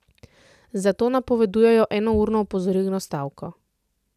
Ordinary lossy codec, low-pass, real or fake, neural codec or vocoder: none; 14.4 kHz; real; none